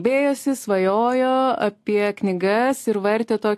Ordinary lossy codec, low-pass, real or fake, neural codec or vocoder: AAC, 64 kbps; 14.4 kHz; real; none